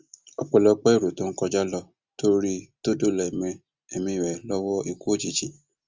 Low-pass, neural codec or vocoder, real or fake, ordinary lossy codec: 7.2 kHz; none; real; Opus, 32 kbps